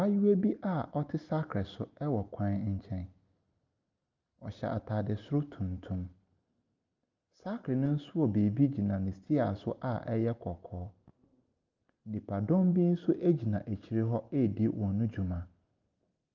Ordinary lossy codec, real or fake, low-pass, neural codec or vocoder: Opus, 32 kbps; real; 7.2 kHz; none